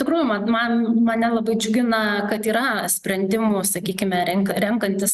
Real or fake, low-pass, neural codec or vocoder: real; 14.4 kHz; none